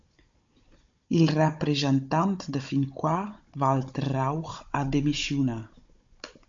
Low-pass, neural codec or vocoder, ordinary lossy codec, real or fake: 7.2 kHz; codec, 16 kHz, 16 kbps, FunCodec, trained on Chinese and English, 50 frames a second; MP3, 48 kbps; fake